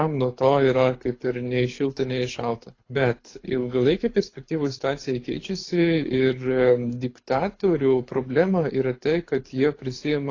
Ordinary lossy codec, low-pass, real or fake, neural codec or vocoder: AAC, 32 kbps; 7.2 kHz; fake; codec, 24 kHz, 6 kbps, HILCodec